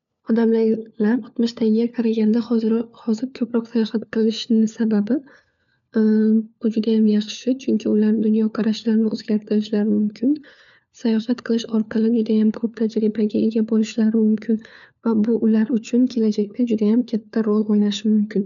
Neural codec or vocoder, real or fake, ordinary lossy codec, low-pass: codec, 16 kHz, 4 kbps, FunCodec, trained on LibriTTS, 50 frames a second; fake; none; 7.2 kHz